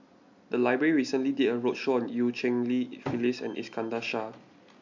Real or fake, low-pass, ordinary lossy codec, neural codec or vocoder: real; 7.2 kHz; MP3, 64 kbps; none